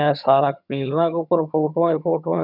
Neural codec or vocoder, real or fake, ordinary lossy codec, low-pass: vocoder, 22.05 kHz, 80 mel bands, HiFi-GAN; fake; none; 5.4 kHz